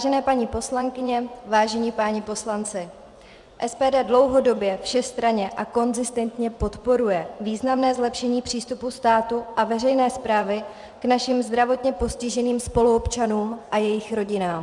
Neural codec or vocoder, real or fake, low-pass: vocoder, 24 kHz, 100 mel bands, Vocos; fake; 10.8 kHz